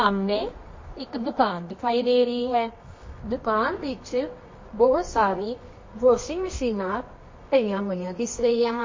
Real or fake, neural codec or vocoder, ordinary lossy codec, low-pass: fake; codec, 24 kHz, 0.9 kbps, WavTokenizer, medium music audio release; MP3, 32 kbps; 7.2 kHz